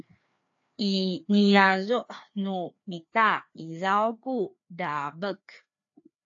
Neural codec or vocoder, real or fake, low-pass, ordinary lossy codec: codec, 16 kHz, 2 kbps, FreqCodec, larger model; fake; 7.2 kHz; AAC, 48 kbps